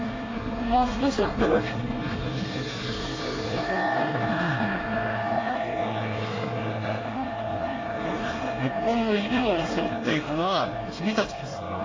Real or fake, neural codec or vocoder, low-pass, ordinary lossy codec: fake; codec, 24 kHz, 1 kbps, SNAC; 7.2 kHz; AAC, 32 kbps